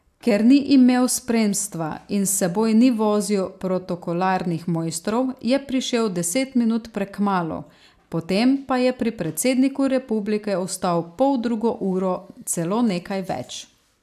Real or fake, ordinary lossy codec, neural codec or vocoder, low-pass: real; AAC, 96 kbps; none; 14.4 kHz